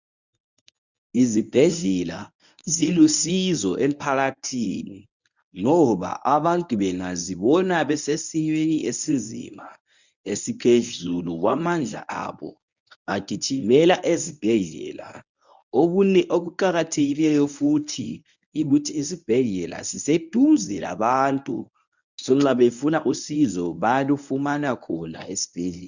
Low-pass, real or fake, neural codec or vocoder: 7.2 kHz; fake; codec, 24 kHz, 0.9 kbps, WavTokenizer, medium speech release version 1